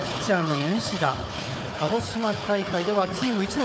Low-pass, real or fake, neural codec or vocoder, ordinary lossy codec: none; fake; codec, 16 kHz, 4 kbps, FunCodec, trained on Chinese and English, 50 frames a second; none